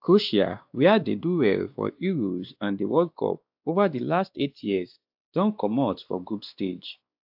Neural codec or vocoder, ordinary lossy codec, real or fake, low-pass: codec, 16 kHz, 2 kbps, X-Codec, WavLM features, trained on Multilingual LibriSpeech; AAC, 48 kbps; fake; 5.4 kHz